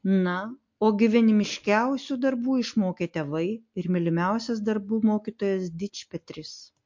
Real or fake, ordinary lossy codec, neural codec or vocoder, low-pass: real; MP3, 48 kbps; none; 7.2 kHz